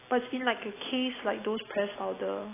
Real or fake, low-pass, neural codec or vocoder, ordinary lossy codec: real; 3.6 kHz; none; AAC, 16 kbps